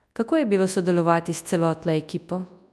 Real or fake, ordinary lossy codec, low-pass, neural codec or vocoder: fake; none; none; codec, 24 kHz, 0.9 kbps, WavTokenizer, large speech release